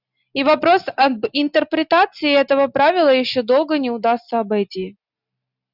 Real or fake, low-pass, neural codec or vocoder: real; 5.4 kHz; none